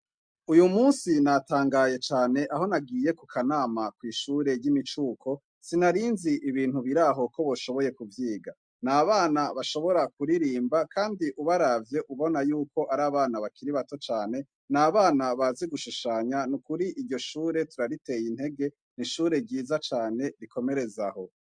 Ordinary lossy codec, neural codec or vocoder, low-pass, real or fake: MP3, 64 kbps; none; 9.9 kHz; real